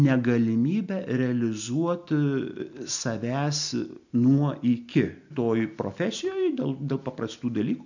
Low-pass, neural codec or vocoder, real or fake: 7.2 kHz; none; real